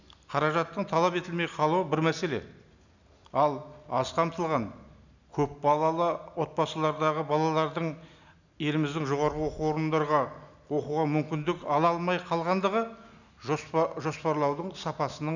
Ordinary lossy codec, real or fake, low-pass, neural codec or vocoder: none; real; 7.2 kHz; none